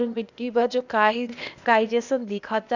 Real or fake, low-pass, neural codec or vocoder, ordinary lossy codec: fake; 7.2 kHz; codec, 16 kHz, 0.8 kbps, ZipCodec; none